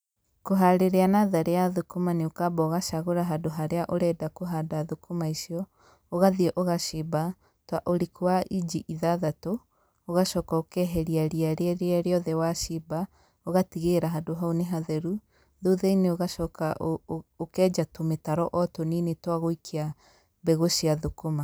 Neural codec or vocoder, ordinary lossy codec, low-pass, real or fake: none; none; none; real